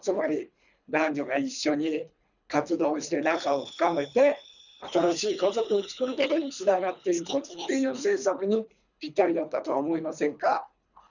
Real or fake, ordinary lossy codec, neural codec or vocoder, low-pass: fake; none; codec, 24 kHz, 3 kbps, HILCodec; 7.2 kHz